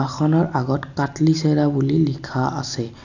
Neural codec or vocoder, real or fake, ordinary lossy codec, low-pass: none; real; none; 7.2 kHz